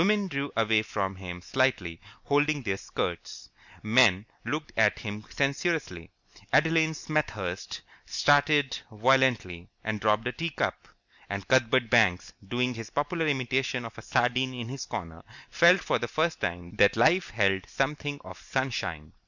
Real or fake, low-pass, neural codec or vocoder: real; 7.2 kHz; none